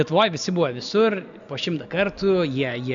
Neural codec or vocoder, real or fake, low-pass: none; real; 7.2 kHz